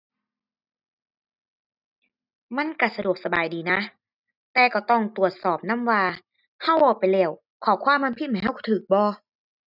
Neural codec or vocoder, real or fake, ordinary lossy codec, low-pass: none; real; none; 5.4 kHz